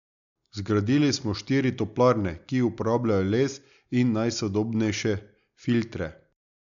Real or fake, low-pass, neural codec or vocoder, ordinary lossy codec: real; 7.2 kHz; none; none